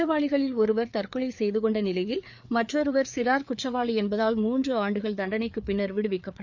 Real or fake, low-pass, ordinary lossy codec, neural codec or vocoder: fake; 7.2 kHz; none; codec, 16 kHz, 4 kbps, FreqCodec, larger model